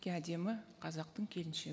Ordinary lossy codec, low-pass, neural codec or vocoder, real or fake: none; none; none; real